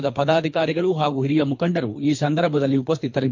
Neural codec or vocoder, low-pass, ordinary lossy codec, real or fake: codec, 24 kHz, 3 kbps, HILCodec; 7.2 kHz; MP3, 48 kbps; fake